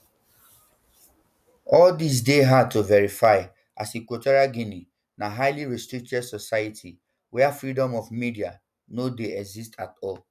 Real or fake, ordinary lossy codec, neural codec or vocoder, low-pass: real; none; none; 14.4 kHz